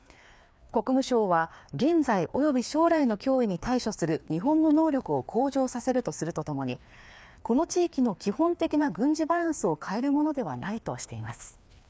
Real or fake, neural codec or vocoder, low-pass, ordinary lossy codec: fake; codec, 16 kHz, 2 kbps, FreqCodec, larger model; none; none